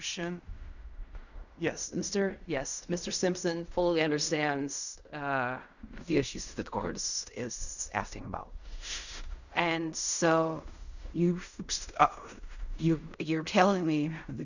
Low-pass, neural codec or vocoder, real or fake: 7.2 kHz; codec, 16 kHz in and 24 kHz out, 0.4 kbps, LongCat-Audio-Codec, fine tuned four codebook decoder; fake